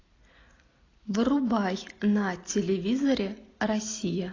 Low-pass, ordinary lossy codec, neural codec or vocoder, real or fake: 7.2 kHz; AAC, 32 kbps; none; real